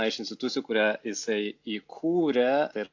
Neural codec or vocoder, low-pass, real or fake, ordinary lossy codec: none; 7.2 kHz; real; AAC, 48 kbps